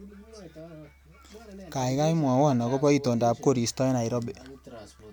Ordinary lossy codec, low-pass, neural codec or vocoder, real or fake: none; none; none; real